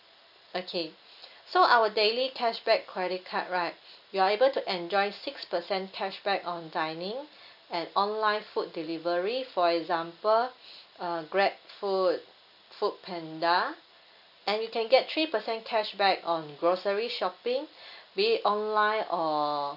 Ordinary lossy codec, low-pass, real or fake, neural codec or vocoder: none; 5.4 kHz; real; none